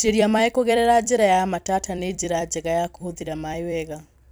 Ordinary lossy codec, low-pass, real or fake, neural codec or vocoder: none; none; fake; vocoder, 44.1 kHz, 128 mel bands every 256 samples, BigVGAN v2